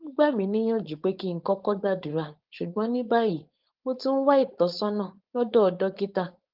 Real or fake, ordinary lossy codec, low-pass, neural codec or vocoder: fake; Opus, 32 kbps; 5.4 kHz; codec, 16 kHz, 4.8 kbps, FACodec